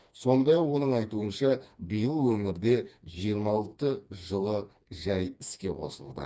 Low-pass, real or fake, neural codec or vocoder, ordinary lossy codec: none; fake; codec, 16 kHz, 2 kbps, FreqCodec, smaller model; none